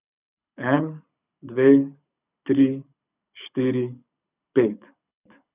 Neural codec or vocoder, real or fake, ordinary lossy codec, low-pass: codec, 24 kHz, 6 kbps, HILCodec; fake; none; 3.6 kHz